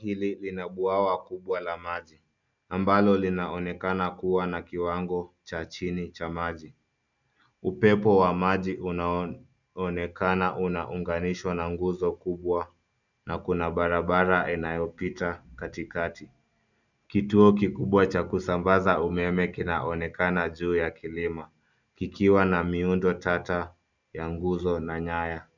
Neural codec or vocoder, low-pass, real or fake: none; 7.2 kHz; real